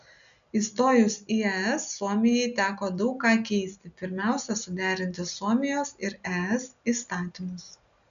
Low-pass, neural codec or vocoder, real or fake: 7.2 kHz; none; real